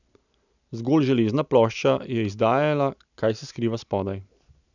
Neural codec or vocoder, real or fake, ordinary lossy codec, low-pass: none; real; none; 7.2 kHz